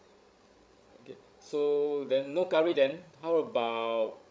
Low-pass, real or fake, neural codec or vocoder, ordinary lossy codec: none; fake; codec, 16 kHz, 16 kbps, FreqCodec, larger model; none